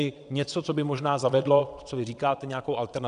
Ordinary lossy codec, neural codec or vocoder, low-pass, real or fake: MP3, 96 kbps; vocoder, 22.05 kHz, 80 mel bands, WaveNeXt; 9.9 kHz; fake